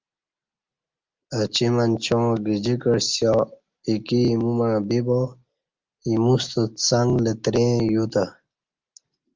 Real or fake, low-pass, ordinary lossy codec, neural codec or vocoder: real; 7.2 kHz; Opus, 24 kbps; none